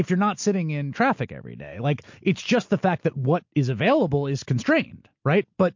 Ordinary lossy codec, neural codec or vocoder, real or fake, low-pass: MP3, 48 kbps; none; real; 7.2 kHz